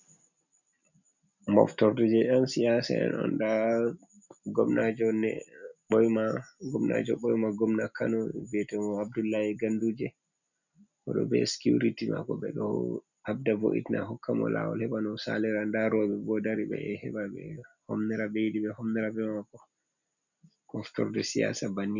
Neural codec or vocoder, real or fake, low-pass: none; real; 7.2 kHz